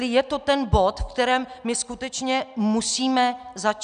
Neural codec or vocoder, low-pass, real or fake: none; 9.9 kHz; real